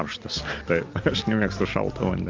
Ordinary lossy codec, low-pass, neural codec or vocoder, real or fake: Opus, 32 kbps; 7.2 kHz; none; real